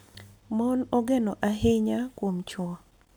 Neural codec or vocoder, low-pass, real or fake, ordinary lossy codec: none; none; real; none